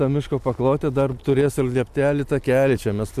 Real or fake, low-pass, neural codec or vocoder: real; 14.4 kHz; none